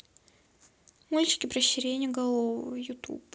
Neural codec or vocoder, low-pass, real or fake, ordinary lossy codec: none; none; real; none